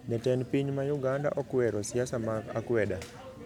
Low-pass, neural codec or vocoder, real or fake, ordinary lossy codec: 19.8 kHz; none; real; none